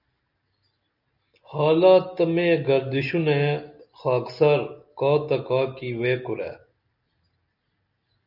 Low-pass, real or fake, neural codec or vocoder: 5.4 kHz; real; none